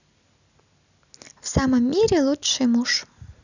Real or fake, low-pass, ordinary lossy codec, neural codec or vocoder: real; 7.2 kHz; none; none